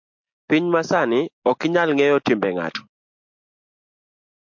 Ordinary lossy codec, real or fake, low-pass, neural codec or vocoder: MP3, 48 kbps; real; 7.2 kHz; none